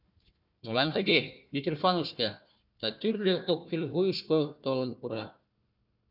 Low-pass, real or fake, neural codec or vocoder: 5.4 kHz; fake; codec, 16 kHz, 1 kbps, FunCodec, trained on Chinese and English, 50 frames a second